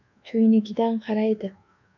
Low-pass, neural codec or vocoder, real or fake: 7.2 kHz; codec, 24 kHz, 1.2 kbps, DualCodec; fake